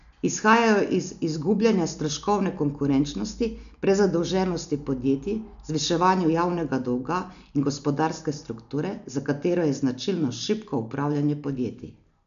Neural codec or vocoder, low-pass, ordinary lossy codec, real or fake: none; 7.2 kHz; none; real